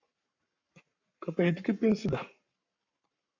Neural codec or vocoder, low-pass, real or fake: codec, 44.1 kHz, 7.8 kbps, Pupu-Codec; 7.2 kHz; fake